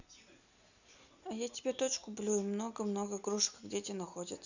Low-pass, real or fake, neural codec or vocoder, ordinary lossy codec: 7.2 kHz; real; none; none